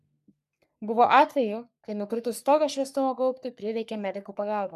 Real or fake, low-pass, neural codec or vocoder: fake; 14.4 kHz; codec, 44.1 kHz, 3.4 kbps, Pupu-Codec